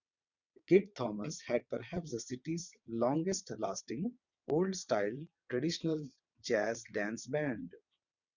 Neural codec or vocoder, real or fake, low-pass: vocoder, 22.05 kHz, 80 mel bands, WaveNeXt; fake; 7.2 kHz